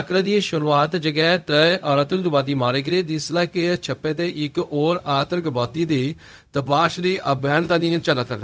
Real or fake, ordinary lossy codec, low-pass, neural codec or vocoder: fake; none; none; codec, 16 kHz, 0.4 kbps, LongCat-Audio-Codec